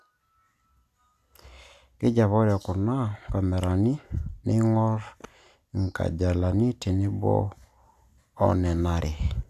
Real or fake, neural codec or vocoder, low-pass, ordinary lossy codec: real; none; 14.4 kHz; none